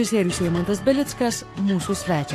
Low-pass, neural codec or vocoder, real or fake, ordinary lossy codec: 14.4 kHz; codec, 44.1 kHz, 7.8 kbps, Pupu-Codec; fake; AAC, 64 kbps